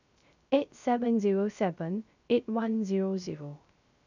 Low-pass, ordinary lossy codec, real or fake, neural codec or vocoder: 7.2 kHz; none; fake; codec, 16 kHz, 0.3 kbps, FocalCodec